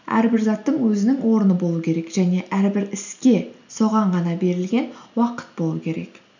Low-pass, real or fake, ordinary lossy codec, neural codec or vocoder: 7.2 kHz; real; none; none